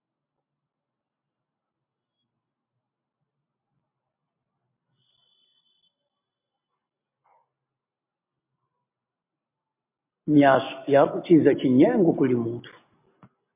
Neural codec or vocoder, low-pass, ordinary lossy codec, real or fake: none; 3.6 kHz; MP3, 24 kbps; real